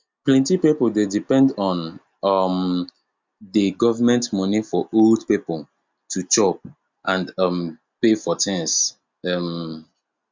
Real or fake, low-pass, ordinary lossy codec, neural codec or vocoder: real; 7.2 kHz; MP3, 64 kbps; none